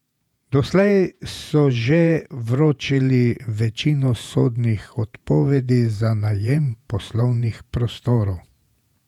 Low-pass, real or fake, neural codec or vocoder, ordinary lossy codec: 19.8 kHz; fake; vocoder, 48 kHz, 128 mel bands, Vocos; none